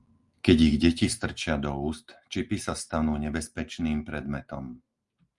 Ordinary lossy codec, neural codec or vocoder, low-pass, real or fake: Opus, 32 kbps; none; 10.8 kHz; real